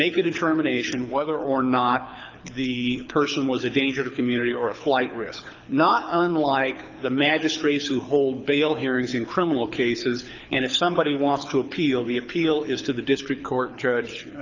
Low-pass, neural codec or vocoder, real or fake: 7.2 kHz; codec, 24 kHz, 6 kbps, HILCodec; fake